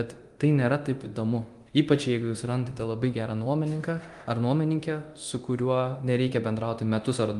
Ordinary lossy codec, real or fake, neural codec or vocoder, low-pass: Opus, 24 kbps; fake; codec, 24 kHz, 0.9 kbps, DualCodec; 10.8 kHz